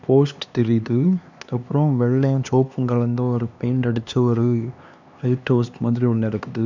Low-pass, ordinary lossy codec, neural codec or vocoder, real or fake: 7.2 kHz; none; codec, 16 kHz, 2 kbps, X-Codec, HuBERT features, trained on LibriSpeech; fake